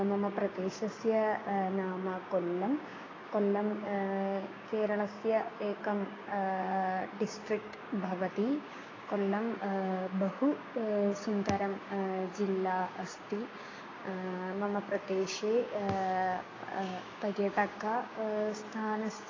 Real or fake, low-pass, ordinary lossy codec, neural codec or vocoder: fake; 7.2 kHz; AAC, 32 kbps; codec, 16 kHz, 6 kbps, DAC